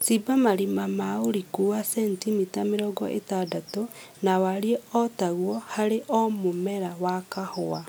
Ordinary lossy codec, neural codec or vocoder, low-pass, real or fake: none; none; none; real